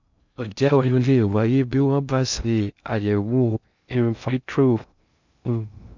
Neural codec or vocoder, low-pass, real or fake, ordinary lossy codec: codec, 16 kHz in and 24 kHz out, 0.6 kbps, FocalCodec, streaming, 2048 codes; 7.2 kHz; fake; none